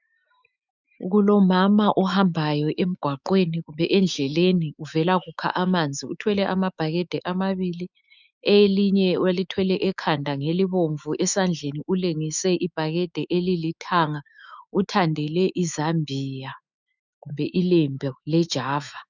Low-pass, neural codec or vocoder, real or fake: 7.2 kHz; autoencoder, 48 kHz, 128 numbers a frame, DAC-VAE, trained on Japanese speech; fake